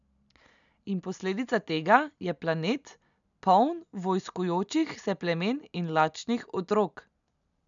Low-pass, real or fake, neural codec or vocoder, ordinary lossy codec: 7.2 kHz; real; none; none